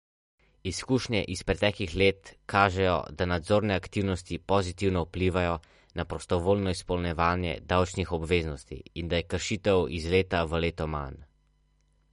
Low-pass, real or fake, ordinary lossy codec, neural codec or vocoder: 10.8 kHz; real; MP3, 48 kbps; none